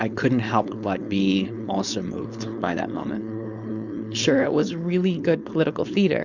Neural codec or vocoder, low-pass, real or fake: codec, 16 kHz, 4.8 kbps, FACodec; 7.2 kHz; fake